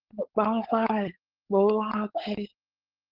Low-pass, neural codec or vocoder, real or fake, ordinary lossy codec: 5.4 kHz; codec, 16 kHz, 4.8 kbps, FACodec; fake; Opus, 16 kbps